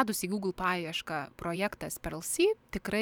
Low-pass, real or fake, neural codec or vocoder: 19.8 kHz; fake; vocoder, 44.1 kHz, 128 mel bands every 512 samples, BigVGAN v2